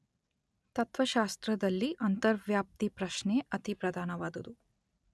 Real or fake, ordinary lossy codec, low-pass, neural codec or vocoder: real; none; none; none